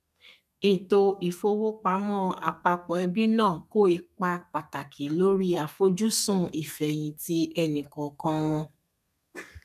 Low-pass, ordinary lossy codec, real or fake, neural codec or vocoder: 14.4 kHz; none; fake; codec, 32 kHz, 1.9 kbps, SNAC